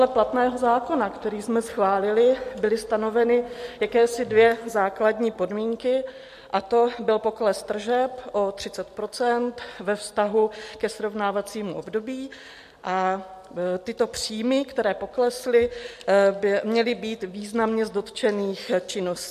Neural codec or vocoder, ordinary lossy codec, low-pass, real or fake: none; MP3, 64 kbps; 14.4 kHz; real